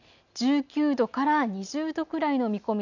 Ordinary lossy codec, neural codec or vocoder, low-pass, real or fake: none; none; 7.2 kHz; real